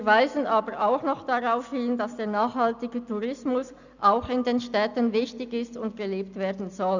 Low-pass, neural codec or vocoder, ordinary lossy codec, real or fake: 7.2 kHz; none; none; real